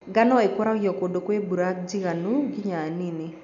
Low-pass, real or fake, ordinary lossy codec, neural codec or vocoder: 7.2 kHz; real; none; none